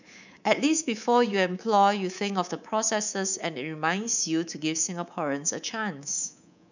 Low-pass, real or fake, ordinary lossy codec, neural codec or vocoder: 7.2 kHz; fake; none; codec, 24 kHz, 3.1 kbps, DualCodec